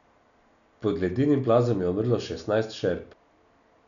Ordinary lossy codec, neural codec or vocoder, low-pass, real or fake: none; none; 7.2 kHz; real